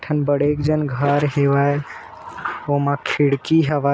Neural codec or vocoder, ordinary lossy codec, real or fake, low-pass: none; none; real; none